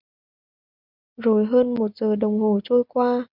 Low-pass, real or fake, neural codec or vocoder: 5.4 kHz; real; none